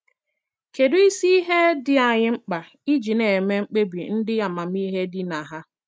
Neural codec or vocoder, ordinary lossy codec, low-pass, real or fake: none; none; none; real